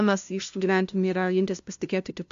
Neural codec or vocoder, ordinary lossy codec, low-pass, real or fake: codec, 16 kHz, 0.5 kbps, FunCodec, trained on LibriTTS, 25 frames a second; AAC, 64 kbps; 7.2 kHz; fake